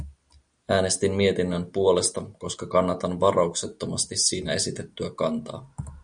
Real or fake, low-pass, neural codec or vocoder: real; 9.9 kHz; none